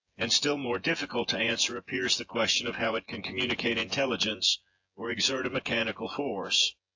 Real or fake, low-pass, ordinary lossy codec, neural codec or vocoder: fake; 7.2 kHz; AAC, 48 kbps; vocoder, 24 kHz, 100 mel bands, Vocos